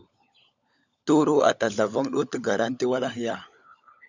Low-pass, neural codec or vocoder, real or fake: 7.2 kHz; codec, 16 kHz, 16 kbps, FunCodec, trained on LibriTTS, 50 frames a second; fake